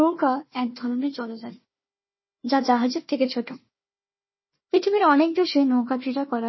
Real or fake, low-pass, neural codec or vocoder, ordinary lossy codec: fake; 7.2 kHz; codec, 16 kHz, 1 kbps, FunCodec, trained on Chinese and English, 50 frames a second; MP3, 24 kbps